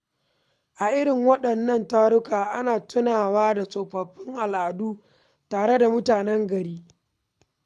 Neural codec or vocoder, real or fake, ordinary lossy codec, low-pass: codec, 24 kHz, 6 kbps, HILCodec; fake; none; none